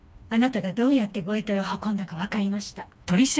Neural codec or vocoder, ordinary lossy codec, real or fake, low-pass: codec, 16 kHz, 2 kbps, FreqCodec, smaller model; none; fake; none